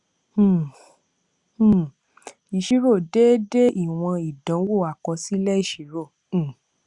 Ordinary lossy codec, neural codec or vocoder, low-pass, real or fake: Opus, 64 kbps; none; 10.8 kHz; real